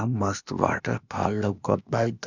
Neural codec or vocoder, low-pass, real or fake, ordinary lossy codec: codec, 16 kHz, 0.8 kbps, ZipCodec; 7.2 kHz; fake; Opus, 64 kbps